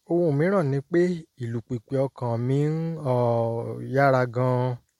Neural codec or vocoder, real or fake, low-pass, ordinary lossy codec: none; real; 19.8 kHz; MP3, 64 kbps